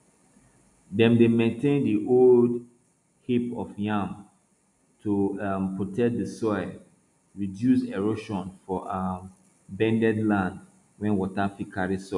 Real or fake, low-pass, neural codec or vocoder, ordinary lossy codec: real; 10.8 kHz; none; none